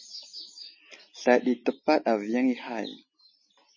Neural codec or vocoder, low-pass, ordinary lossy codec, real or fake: none; 7.2 kHz; MP3, 32 kbps; real